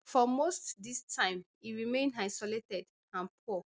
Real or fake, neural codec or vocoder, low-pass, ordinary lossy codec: real; none; none; none